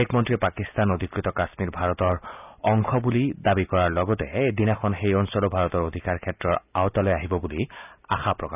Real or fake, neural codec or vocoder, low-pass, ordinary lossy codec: real; none; 3.6 kHz; none